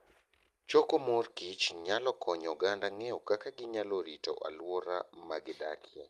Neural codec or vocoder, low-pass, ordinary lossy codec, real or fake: none; 14.4 kHz; Opus, 32 kbps; real